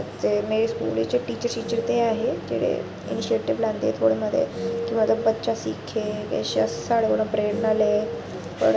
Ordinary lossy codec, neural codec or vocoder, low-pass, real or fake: none; none; none; real